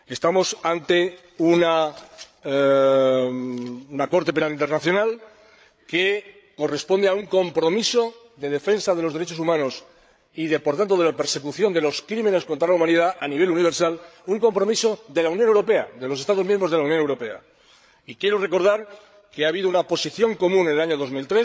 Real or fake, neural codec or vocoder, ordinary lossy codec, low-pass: fake; codec, 16 kHz, 8 kbps, FreqCodec, larger model; none; none